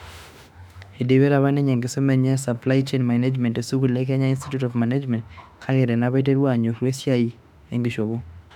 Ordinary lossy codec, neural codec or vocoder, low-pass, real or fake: none; autoencoder, 48 kHz, 32 numbers a frame, DAC-VAE, trained on Japanese speech; 19.8 kHz; fake